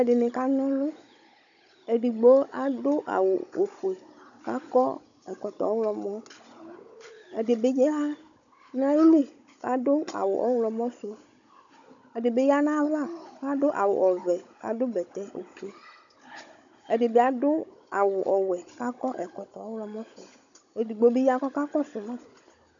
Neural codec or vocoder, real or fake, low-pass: codec, 16 kHz, 16 kbps, FunCodec, trained on Chinese and English, 50 frames a second; fake; 7.2 kHz